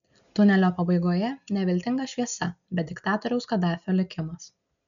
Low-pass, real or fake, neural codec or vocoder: 7.2 kHz; real; none